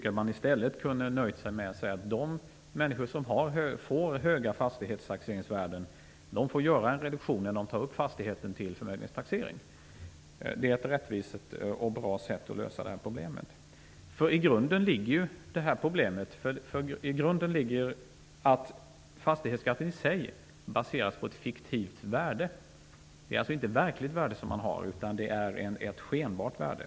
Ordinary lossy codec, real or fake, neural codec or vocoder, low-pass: none; real; none; none